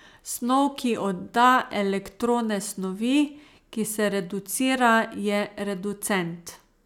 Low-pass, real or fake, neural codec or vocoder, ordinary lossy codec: 19.8 kHz; real; none; none